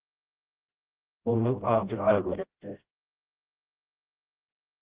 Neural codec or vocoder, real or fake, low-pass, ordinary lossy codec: codec, 16 kHz, 0.5 kbps, FreqCodec, smaller model; fake; 3.6 kHz; Opus, 16 kbps